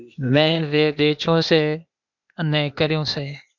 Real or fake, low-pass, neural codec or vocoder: fake; 7.2 kHz; codec, 16 kHz, 0.8 kbps, ZipCodec